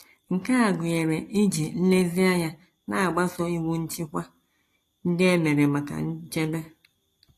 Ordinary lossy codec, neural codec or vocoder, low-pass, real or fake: AAC, 48 kbps; none; 14.4 kHz; real